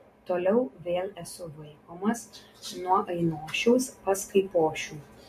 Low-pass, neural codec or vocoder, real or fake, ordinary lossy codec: 14.4 kHz; none; real; MP3, 64 kbps